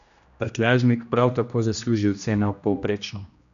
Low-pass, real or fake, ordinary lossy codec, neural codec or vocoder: 7.2 kHz; fake; none; codec, 16 kHz, 1 kbps, X-Codec, HuBERT features, trained on general audio